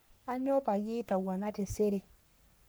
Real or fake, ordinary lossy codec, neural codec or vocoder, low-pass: fake; none; codec, 44.1 kHz, 3.4 kbps, Pupu-Codec; none